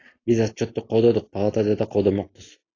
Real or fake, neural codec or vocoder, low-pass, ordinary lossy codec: real; none; 7.2 kHz; AAC, 32 kbps